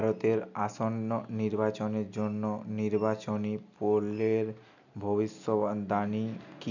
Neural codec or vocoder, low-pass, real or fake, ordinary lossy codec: none; 7.2 kHz; real; none